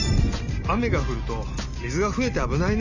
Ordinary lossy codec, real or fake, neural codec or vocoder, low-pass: none; real; none; 7.2 kHz